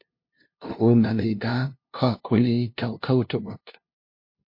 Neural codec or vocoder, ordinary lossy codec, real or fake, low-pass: codec, 16 kHz, 0.5 kbps, FunCodec, trained on LibriTTS, 25 frames a second; MP3, 32 kbps; fake; 5.4 kHz